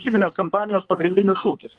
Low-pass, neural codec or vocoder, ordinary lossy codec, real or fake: 10.8 kHz; codec, 24 kHz, 1 kbps, SNAC; Opus, 24 kbps; fake